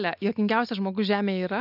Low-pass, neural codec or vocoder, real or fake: 5.4 kHz; none; real